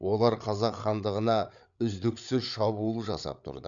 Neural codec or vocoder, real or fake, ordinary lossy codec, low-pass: codec, 16 kHz, 8 kbps, FreqCodec, larger model; fake; none; 7.2 kHz